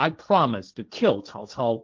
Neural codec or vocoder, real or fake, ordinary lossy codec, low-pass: codec, 16 kHz, 1.1 kbps, Voila-Tokenizer; fake; Opus, 32 kbps; 7.2 kHz